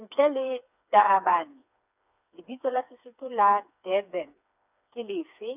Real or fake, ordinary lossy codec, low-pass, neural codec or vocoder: fake; none; 3.6 kHz; codec, 16 kHz, 4 kbps, FreqCodec, smaller model